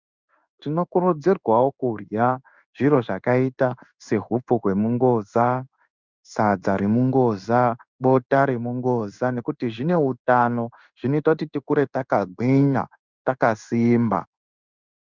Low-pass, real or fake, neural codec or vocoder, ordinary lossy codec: 7.2 kHz; fake; codec, 16 kHz in and 24 kHz out, 1 kbps, XY-Tokenizer; Opus, 64 kbps